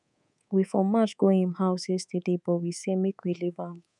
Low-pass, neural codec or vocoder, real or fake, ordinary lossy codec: 10.8 kHz; codec, 24 kHz, 3.1 kbps, DualCodec; fake; none